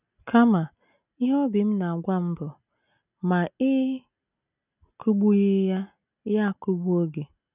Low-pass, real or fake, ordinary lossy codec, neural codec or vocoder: 3.6 kHz; real; none; none